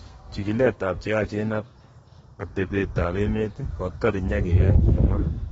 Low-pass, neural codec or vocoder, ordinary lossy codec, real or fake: 14.4 kHz; codec, 32 kHz, 1.9 kbps, SNAC; AAC, 24 kbps; fake